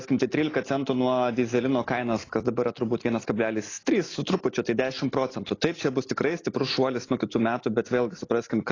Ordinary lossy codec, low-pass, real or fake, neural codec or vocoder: AAC, 32 kbps; 7.2 kHz; real; none